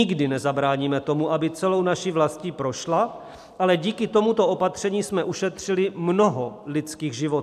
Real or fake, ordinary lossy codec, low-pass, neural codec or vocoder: real; AAC, 96 kbps; 14.4 kHz; none